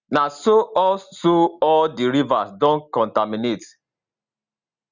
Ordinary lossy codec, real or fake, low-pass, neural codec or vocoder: Opus, 64 kbps; real; 7.2 kHz; none